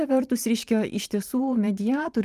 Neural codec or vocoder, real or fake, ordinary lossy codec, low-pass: vocoder, 44.1 kHz, 128 mel bands every 512 samples, BigVGAN v2; fake; Opus, 32 kbps; 14.4 kHz